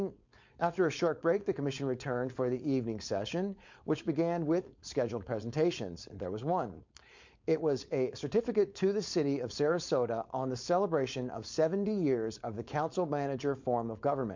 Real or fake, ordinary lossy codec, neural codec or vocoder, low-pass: fake; MP3, 48 kbps; codec, 16 kHz, 4.8 kbps, FACodec; 7.2 kHz